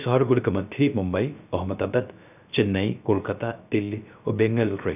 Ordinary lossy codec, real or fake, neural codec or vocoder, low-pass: none; fake; codec, 16 kHz, 0.3 kbps, FocalCodec; 3.6 kHz